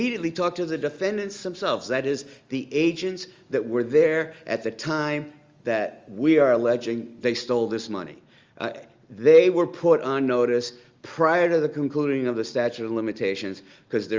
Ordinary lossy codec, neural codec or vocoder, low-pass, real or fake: Opus, 32 kbps; none; 7.2 kHz; real